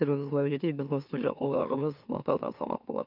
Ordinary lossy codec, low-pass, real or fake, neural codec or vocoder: none; 5.4 kHz; fake; autoencoder, 44.1 kHz, a latent of 192 numbers a frame, MeloTTS